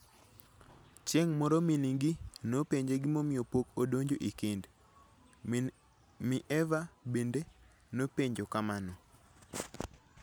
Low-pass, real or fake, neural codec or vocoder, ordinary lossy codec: none; real; none; none